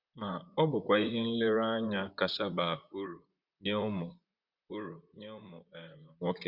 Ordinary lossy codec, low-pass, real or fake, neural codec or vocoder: Opus, 64 kbps; 5.4 kHz; fake; vocoder, 44.1 kHz, 128 mel bands, Pupu-Vocoder